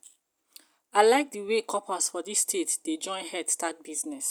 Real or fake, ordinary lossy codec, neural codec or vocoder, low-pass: real; none; none; none